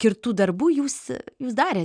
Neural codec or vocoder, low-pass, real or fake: none; 9.9 kHz; real